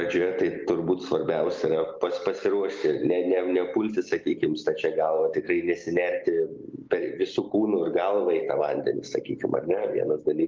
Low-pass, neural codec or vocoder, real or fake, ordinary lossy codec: 7.2 kHz; none; real; Opus, 32 kbps